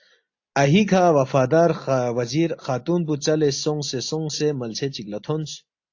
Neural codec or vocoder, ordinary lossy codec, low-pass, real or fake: none; AAC, 48 kbps; 7.2 kHz; real